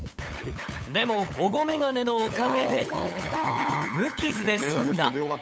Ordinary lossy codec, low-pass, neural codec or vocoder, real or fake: none; none; codec, 16 kHz, 8 kbps, FunCodec, trained on LibriTTS, 25 frames a second; fake